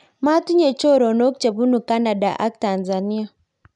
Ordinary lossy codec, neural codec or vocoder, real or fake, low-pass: none; none; real; 10.8 kHz